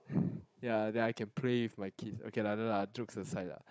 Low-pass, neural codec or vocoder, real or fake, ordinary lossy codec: none; codec, 16 kHz, 16 kbps, FunCodec, trained on Chinese and English, 50 frames a second; fake; none